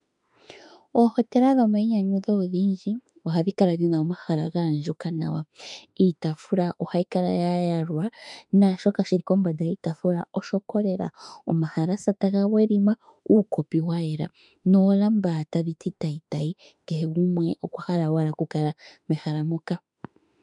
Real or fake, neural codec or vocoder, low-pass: fake; autoencoder, 48 kHz, 32 numbers a frame, DAC-VAE, trained on Japanese speech; 10.8 kHz